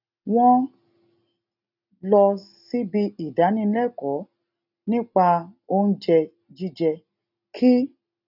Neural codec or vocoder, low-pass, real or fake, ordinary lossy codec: none; 5.4 kHz; real; none